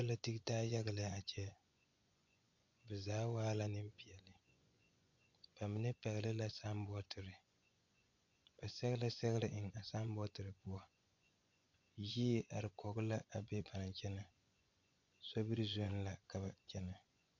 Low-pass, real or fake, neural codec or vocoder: 7.2 kHz; fake; vocoder, 24 kHz, 100 mel bands, Vocos